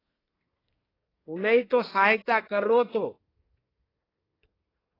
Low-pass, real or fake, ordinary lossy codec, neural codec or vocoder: 5.4 kHz; fake; AAC, 24 kbps; codec, 24 kHz, 1 kbps, SNAC